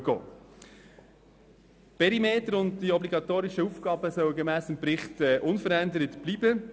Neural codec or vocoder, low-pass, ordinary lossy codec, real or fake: none; none; none; real